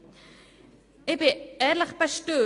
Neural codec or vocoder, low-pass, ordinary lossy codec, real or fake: none; 10.8 kHz; AAC, 48 kbps; real